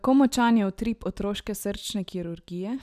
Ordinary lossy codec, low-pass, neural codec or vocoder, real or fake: none; 14.4 kHz; none; real